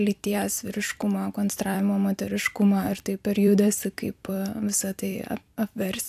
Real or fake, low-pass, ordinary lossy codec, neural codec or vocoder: fake; 14.4 kHz; AAC, 96 kbps; vocoder, 44.1 kHz, 128 mel bands every 256 samples, BigVGAN v2